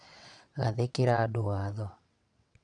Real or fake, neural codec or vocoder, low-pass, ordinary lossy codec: fake; vocoder, 22.05 kHz, 80 mel bands, WaveNeXt; 9.9 kHz; none